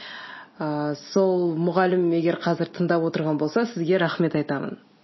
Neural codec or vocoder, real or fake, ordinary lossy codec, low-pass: none; real; MP3, 24 kbps; 7.2 kHz